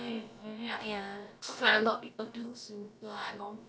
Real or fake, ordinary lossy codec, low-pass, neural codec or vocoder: fake; none; none; codec, 16 kHz, about 1 kbps, DyCAST, with the encoder's durations